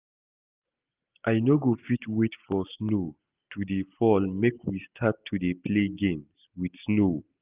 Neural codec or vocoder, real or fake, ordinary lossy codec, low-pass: none; real; Opus, 24 kbps; 3.6 kHz